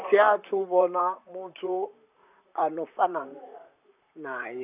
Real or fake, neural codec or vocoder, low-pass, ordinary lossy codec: fake; vocoder, 44.1 kHz, 128 mel bands, Pupu-Vocoder; 3.6 kHz; none